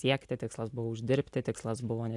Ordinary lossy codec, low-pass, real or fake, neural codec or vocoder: AAC, 64 kbps; 10.8 kHz; real; none